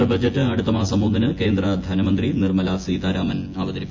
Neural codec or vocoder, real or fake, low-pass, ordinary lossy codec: vocoder, 24 kHz, 100 mel bands, Vocos; fake; 7.2 kHz; MP3, 48 kbps